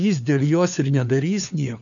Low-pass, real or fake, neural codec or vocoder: 7.2 kHz; fake; codec, 16 kHz, 4 kbps, FunCodec, trained on LibriTTS, 50 frames a second